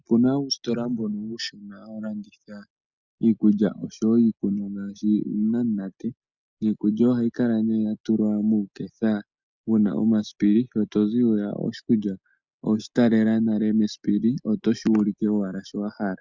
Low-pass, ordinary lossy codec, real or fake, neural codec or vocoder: 7.2 kHz; Opus, 64 kbps; real; none